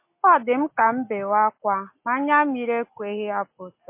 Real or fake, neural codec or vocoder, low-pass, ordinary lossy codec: real; none; 3.6 kHz; MP3, 24 kbps